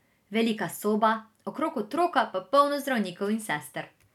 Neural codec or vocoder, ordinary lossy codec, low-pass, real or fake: none; none; 19.8 kHz; real